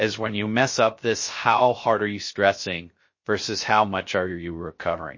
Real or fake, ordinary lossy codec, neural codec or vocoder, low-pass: fake; MP3, 32 kbps; codec, 16 kHz, 0.3 kbps, FocalCodec; 7.2 kHz